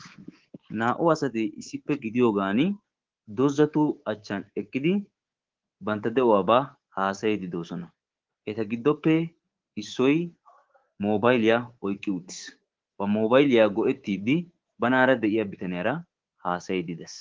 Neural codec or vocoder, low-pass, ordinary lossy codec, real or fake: codec, 24 kHz, 3.1 kbps, DualCodec; 7.2 kHz; Opus, 16 kbps; fake